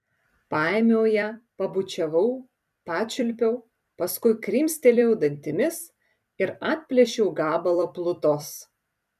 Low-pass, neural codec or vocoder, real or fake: 14.4 kHz; vocoder, 44.1 kHz, 128 mel bands every 256 samples, BigVGAN v2; fake